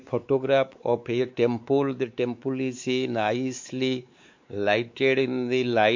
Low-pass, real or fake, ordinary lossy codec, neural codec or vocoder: 7.2 kHz; fake; MP3, 48 kbps; codec, 16 kHz, 4 kbps, X-Codec, WavLM features, trained on Multilingual LibriSpeech